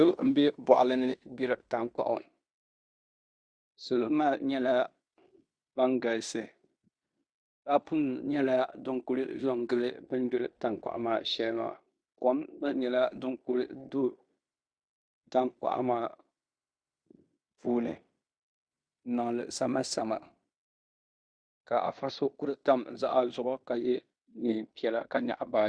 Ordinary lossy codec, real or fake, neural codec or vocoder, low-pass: Opus, 64 kbps; fake; codec, 16 kHz in and 24 kHz out, 0.9 kbps, LongCat-Audio-Codec, fine tuned four codebook decoder; 9.9 kHz